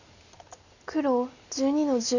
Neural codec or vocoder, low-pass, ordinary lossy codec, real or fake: none; 7.2 kHz; none; real